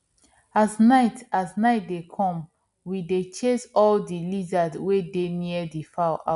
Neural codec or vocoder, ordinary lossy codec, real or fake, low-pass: none; none; real; 10.8 kHz